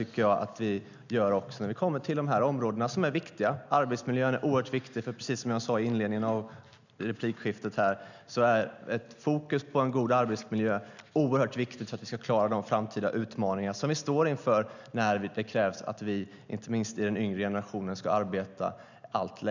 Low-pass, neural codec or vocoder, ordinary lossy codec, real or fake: 7.2 kHz; none; none; real